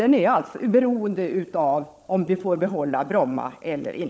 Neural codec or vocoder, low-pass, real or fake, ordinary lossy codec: codec, 16 kHz, 16 kbps, FunCodec, trained on LibriTTS, 50 frames a second; none; fake; none